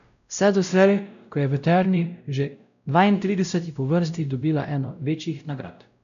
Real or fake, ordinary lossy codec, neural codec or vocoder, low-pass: fake; none; codec, 16 kHz, 0.5 kbps, X-Codec, WavLM features, trained on Multilingual LibriSpeech; 7.2 kHz